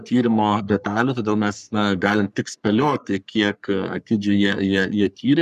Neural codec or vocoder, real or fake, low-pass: codec, 44.1 kHz, 3.4 kbps, Pupu-Codec; fake; 14.4 kHz